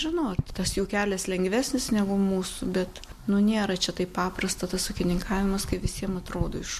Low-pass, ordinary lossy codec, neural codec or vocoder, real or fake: 14.4 kHz; MP3, 64 kbps; none; real